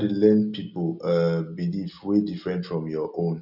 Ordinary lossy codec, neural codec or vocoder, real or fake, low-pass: none; none; real; 5.4 kHz